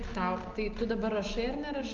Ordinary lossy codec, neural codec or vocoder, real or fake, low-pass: Opus, 24 kbps; none; real; 7.2 kHz